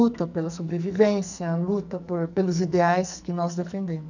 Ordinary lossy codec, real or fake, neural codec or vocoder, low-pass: none; fake; codec, 44.1 kHz, 2.6 kbps, SNAC; 7.2 kHz